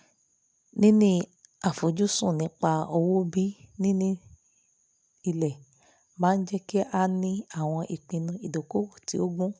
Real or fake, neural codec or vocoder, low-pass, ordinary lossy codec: real; none; none; none